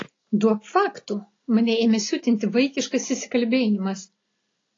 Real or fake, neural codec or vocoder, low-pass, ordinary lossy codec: real; none; 7.2 kHz; AAC, 32 kbps